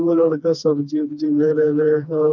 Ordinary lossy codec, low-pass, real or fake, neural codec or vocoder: none; 7.2 kHz; fake; codec, 16 kHz, 2 kbps, FreqCodec, smaller model